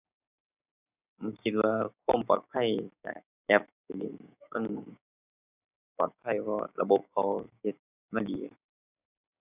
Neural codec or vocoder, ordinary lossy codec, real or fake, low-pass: codec, 16 kHz, 6 kbps, DAC; none; fake; 3.6 kHz